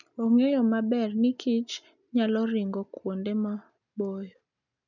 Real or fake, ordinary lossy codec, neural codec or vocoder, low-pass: real; none; none; 7.2 kHz